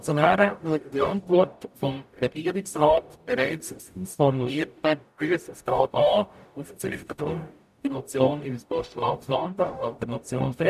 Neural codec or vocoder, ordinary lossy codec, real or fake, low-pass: codec, 44.1 kHz, 0.9 kbps, DAC; none; fake; 14.4 kHz